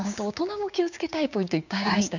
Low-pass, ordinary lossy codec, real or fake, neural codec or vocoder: 7.2 kHz; none; fake; codec, 16 kHz, 6 kbps, DAC